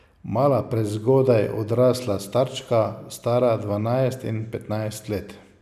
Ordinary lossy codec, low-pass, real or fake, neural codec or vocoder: none; 14.4 kHz; real; none